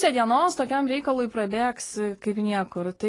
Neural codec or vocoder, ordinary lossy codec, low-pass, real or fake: codec, 44.1 kHz, 7.8 kbps, DAC; AAC, 32 kbps; 10.8 kHz; fake